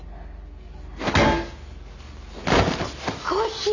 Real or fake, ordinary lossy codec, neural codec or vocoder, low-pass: real; none; none; 7.2 kHz